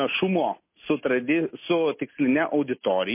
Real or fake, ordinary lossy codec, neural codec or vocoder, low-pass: real; MP3, 24 kbps; none; 3.6 kHz